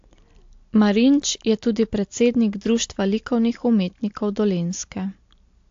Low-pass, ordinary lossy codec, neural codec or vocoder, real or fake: 7.2 kHz; AAC, 48 kbps; none; real